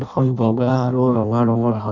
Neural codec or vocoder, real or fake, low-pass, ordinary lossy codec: codec, 16 kHz in and 24 kHz out, 0.6 kbps, FireRedTTS-2 codec; fake; 7.2 kHz; none